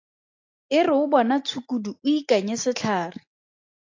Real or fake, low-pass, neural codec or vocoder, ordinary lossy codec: real; 7.2 kHz; none; AAC, 48 kbps